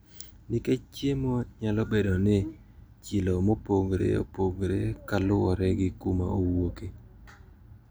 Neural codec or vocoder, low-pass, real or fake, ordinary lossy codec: none; none; real; none